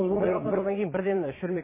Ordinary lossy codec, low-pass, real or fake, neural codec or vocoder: MP3, 16 kbps; 3.6 kHz; fake; vocoder, 22.05 kHz, 80 mel bands, Vocos